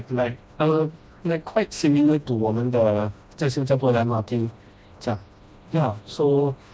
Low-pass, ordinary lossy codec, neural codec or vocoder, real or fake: none; none; codec, 16 kHz, 1 kbps, FreqCodec, smaller model; fake